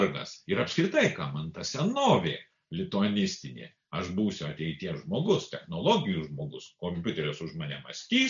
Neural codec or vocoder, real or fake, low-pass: none; real; 7.2 kHz